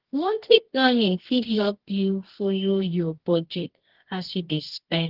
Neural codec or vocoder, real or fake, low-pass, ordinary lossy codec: codec, 24 kHz, 0.9 kbps, WavTokenizer, medium music audio release; fake; 5.4 kHz; Opus, 16 kbps